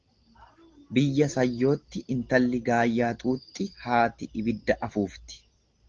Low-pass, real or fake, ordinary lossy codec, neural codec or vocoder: 7.2 kHz; real; Opus, 16 kbps; none